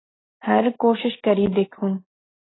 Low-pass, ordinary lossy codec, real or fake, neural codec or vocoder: 7.2 kHz; AAC, 16 kbps; real; none